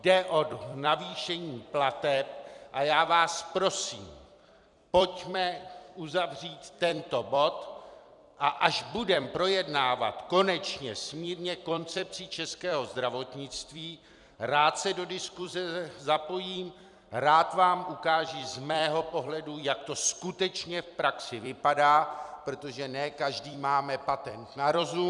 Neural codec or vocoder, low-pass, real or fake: vocoder, 44.1 kHz, 128 mel bands every 256 samples, BigVGAN v2; 10.8 kHz; fake